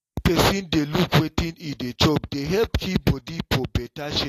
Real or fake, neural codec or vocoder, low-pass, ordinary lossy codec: real; none; 14.4 kHz; AAC, 48 kbps